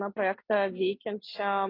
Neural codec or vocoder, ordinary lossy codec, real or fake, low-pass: none; AAC, 24 kbps; real; 5.4 kHz